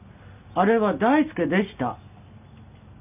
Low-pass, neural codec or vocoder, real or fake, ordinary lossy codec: 3.6 kHz; none; real; none